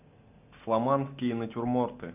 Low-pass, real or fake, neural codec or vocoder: 3.6 kHz; real; none